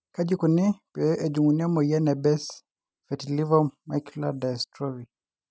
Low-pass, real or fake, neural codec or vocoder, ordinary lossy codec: none; real; none; none